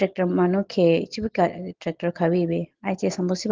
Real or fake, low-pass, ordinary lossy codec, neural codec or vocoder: real; 7.2 kHz; Opus, 16 kbps; none